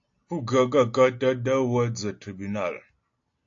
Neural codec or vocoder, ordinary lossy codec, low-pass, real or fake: none; AAC, 48 kbps; 7.2 kHz; real